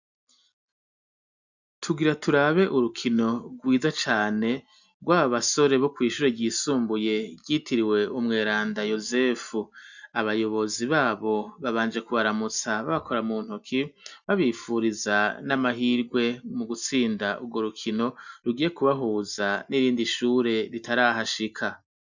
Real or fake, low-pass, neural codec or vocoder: real; 7.2 kHz; none